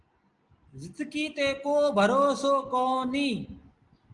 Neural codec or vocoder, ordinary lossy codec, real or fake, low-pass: none; Opus, 24 kbps; real; 10.8 kHz